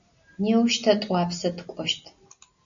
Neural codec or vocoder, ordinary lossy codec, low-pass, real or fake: none; AAC, 64 kbps; 7.2 kHz; real